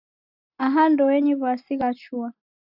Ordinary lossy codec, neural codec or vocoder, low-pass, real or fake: AAC, 48 kbps; vocoder, 44.1 kHz, 128 mel bands every 256 samples, BigVGAN v2; 5.4 kHz; fake